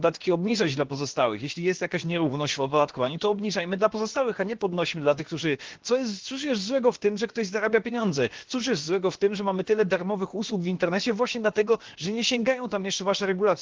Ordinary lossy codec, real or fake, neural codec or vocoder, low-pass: Opus, 16 kbps; fake; codec, 16 kHz, about 1 kbps, DyCAST, with the encoder's durations; 7.2 kHz